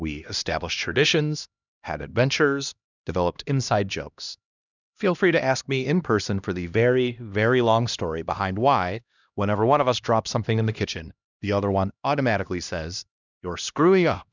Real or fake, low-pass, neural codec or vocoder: fake; 7.2 kHz; codec, 16 kHz, 1 kbps, X-Codec, HuBERT features, trained on LibriSpeech